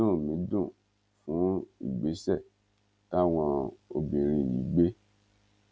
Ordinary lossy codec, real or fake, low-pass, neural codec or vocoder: none; real; none; none